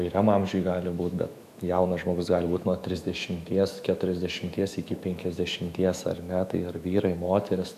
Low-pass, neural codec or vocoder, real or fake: 14.4 kHz; autoencoder, 48 kHz, 128 numbers a frame, DAC-VAE, trained on Japanese speech; fake